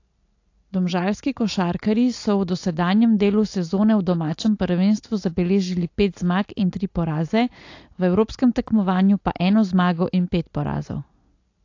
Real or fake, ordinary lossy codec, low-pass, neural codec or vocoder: real; AAC, 48 kbps; 7.2 kHz; none